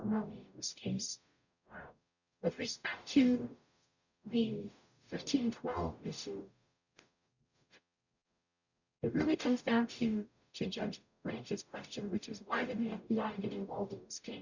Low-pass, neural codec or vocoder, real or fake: 7.2 kHz; codec, 44.1 kHz, 0.9 kbps, DAC; fake